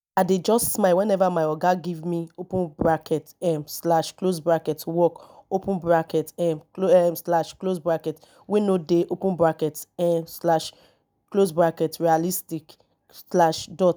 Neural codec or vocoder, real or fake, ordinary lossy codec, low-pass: none; real; none; none